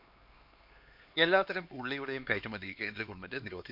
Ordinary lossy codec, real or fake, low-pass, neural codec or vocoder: MP3, 48 kbps; fake; 5.4 kHz; codec, 16 kHz, 2 kbps, X-Codec, HuBERT features, trained on LibriSpeech